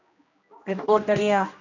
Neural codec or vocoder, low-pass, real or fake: codec, 16 kHz, 1 kbps, X-Codec, HuBERT features, trained on general audio; 7.2 kHz; fake